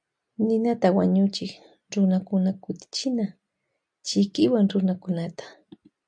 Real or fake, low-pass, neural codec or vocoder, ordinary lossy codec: real; 9.9 kHz; none; AAC, 64 kbps